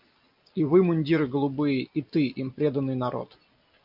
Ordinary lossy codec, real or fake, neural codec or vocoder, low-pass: MP3, 48 kbps; real; none; 5.4 kHz